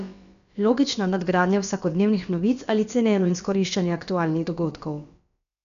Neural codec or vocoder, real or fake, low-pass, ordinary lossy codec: codec, 16 kHz, about 1 kbps, DyCAST, with the encoder's durations; fake; 7.2 kHz; none